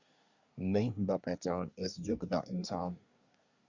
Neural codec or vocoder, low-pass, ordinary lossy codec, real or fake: codec, 24 kHz, 1 kbps, SNAC; 7.2 kHz; Opus, 64 kbps; fake